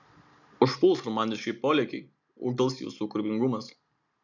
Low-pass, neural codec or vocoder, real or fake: 7.2 kHz; none; real